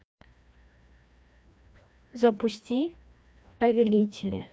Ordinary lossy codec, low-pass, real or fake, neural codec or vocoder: none; none; fake; codec, 16 kHz, 1 kbps, FreqCodec, larger model